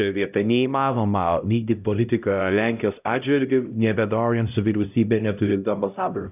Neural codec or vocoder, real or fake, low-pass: codec, 16 kHz, 0.5 kbps, X-Codec, HuBERT features, trained on LibriSpeech; fake; 3.6 kHz